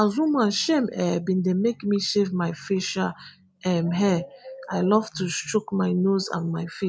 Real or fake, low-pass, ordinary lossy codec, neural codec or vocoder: real; none; none; none